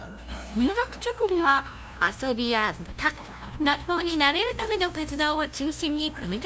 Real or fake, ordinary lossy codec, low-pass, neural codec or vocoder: fake; none; none; codec, 16 kHz, 0.5 kbps, FunCodec, trained on LibriTTS, 25 frames a second